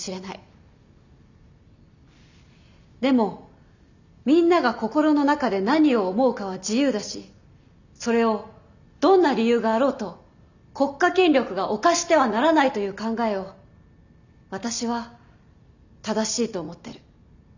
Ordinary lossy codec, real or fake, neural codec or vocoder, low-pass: none; real; none; 7.2 kHz